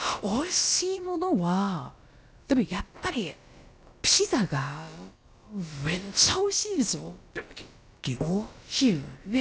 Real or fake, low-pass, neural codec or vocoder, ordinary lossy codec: fake; none; codec, 16 kHz, about 1 kbps, DyCAST, with the encoder's durations; none